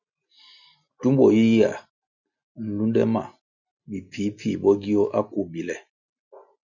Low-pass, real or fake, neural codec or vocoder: 7.2 kHz; real; none